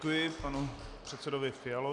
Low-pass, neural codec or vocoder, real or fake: 10.8 kHz; none; real